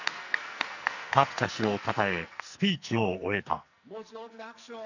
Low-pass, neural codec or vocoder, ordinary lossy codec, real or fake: 7.2 kHz; codec, 44.1 kHz, 2.6 kbps, SNAC; none; fake